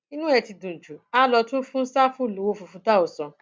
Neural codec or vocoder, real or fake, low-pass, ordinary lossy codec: none; real; none; none